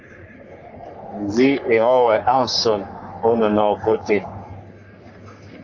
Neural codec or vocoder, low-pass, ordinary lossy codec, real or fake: codec, 44.1 kHz, 3.4 kbps, Pupu-Codec; 7.2 kHz; AAC, 48 kbps; fake